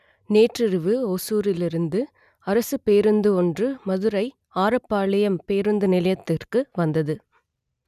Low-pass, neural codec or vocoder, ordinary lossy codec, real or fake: 14.4 kHz; none; none; real